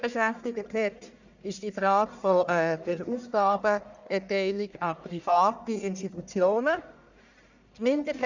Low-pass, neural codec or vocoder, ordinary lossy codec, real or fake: 7.2 kHz; codec, 44.1 kHz, 1.7 kbps, Pupu-Codec; none; fake